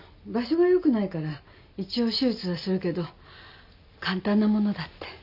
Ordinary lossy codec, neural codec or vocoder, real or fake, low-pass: none; none; real; 5.4 kHz